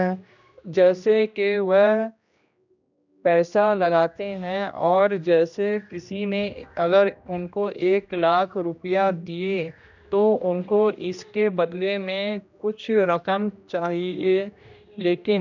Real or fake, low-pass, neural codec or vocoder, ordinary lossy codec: fake; 7.2 kHz; codec, 16 kHz, 1 kbps, X-Codec, HuBERT features, trained on general audio; none